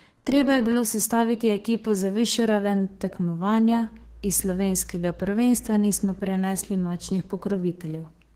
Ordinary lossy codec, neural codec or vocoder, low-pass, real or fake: Opus, 16 kbps; codec, 32 kHz, 1.9 kbps, SNAC; 14.4 kHz; fake